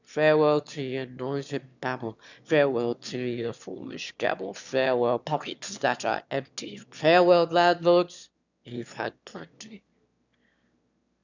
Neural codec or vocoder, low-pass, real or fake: autoencoder, 22.05 kHz, a latent of 192 numbers a frame, VITS, trained on one speaker; 7.2 kHz; fake